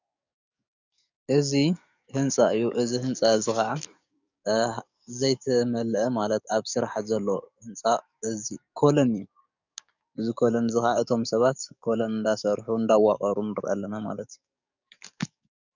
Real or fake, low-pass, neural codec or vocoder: fake; 7.2 kHz; codec, 44.1 kHz, 7.8 kbps, DAC